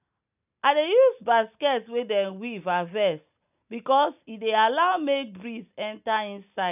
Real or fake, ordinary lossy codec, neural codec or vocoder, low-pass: real; none; none; 3.6 kHz